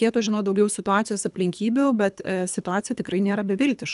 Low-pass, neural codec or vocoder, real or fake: 10.8 kHz; codec, 24 kHz, 3 kbps, HILCodec; fake